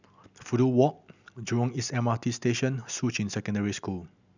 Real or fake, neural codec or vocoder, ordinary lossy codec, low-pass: real; none; none; 7.2 kHz